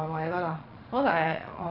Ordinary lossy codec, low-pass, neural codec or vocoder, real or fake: none; 5.4 kHz; vocoder, 22.05 kHz, 80 mel bands, Vocos; fake